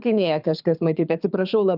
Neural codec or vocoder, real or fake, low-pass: codec, 24 kHz, 6 kbps, HILCodec; fake; 5.4 kHz